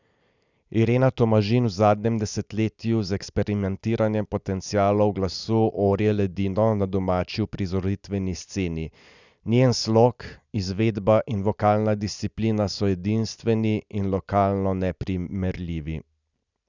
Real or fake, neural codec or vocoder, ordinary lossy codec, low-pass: real; none; none; 7.2 kHz